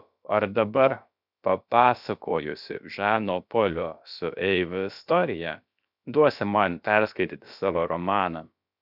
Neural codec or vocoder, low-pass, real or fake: codec, 16 kHz, about 1 kbps, DyCAST, with the encoder's durations; 5.4 kHz; fake